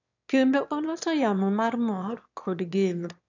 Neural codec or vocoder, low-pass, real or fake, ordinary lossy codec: autoencoder, 22.05 kHz, a latent of 192 numbers a frame, VITS, trained on one speaker; 7.2 kHz; fake; none